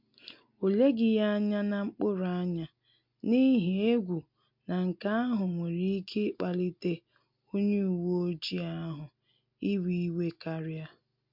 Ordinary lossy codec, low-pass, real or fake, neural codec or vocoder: MP3, 48 kbps; 5.4 kHz; real; none